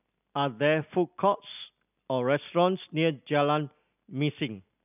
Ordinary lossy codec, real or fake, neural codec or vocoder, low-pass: none; real; none; 3.6 kHz